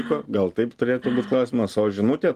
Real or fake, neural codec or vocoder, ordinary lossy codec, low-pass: real; none; Opus, 24 kbps; 14.4 kHz